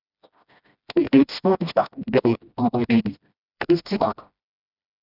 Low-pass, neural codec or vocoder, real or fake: 5.4 kHz; codec, 16 kHz, 1 kbps, FreqCodec, smaller model; fake